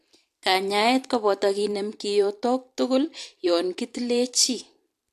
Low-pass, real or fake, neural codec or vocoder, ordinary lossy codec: 14.4 kHz; fake; vocoder, 44.1 kHz, 128 mel bands every 512 samples, BigVGAN v2; AAC, 64 kbps